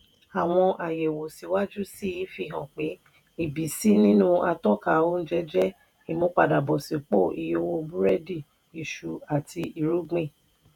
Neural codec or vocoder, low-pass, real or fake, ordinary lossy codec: vocoder, 48 kHz, 128 mel bands, Vocos; 19.8 kHz; fake; none